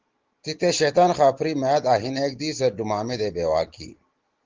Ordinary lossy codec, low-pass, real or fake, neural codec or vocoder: Opus, 16 kbps; 7.2 kHz; real; none